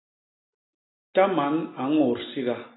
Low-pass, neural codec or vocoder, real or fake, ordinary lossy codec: 7.2 kHz; none; real; AAC, 16 kbps